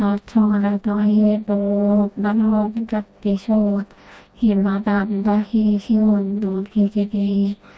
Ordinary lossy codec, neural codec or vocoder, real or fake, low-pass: none; codec, 16 kHz, 1 kbps, FreqCodec, smaller model; fake; none